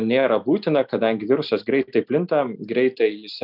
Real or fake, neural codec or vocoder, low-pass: real; none; 5.4 kHz